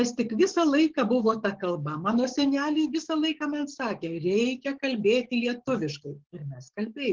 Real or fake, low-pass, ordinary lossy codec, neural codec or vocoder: fake; 7.2 kHz; Opus, 16 kbps; codec, 44.1 kHz, 7.8 kbps, DAC